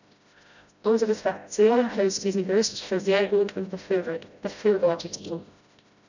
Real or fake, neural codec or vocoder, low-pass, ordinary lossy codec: fake; codec, 16 kHz, 0.5 kbps, FreqCodec, smaller model; 7.2 kHz; none